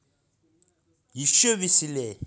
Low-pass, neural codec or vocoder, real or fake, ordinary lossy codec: none; none; real; none